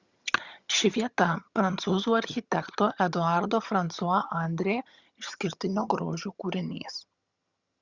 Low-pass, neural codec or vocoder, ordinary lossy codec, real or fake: 7.2 kHz; vocoder, 22.05 kHz, 80 mel bands, HiFi-GAN; Opus, 64 kbps; fake